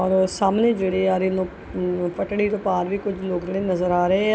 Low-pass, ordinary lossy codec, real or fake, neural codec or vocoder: none; none; real; none